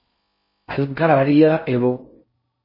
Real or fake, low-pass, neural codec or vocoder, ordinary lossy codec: fake; 5.4 kHz; codec, 16 kHz in and 24 kHz out, 0.6 kbps, FocalCodec, streaming, 4096 codes; MP3, 24 kbps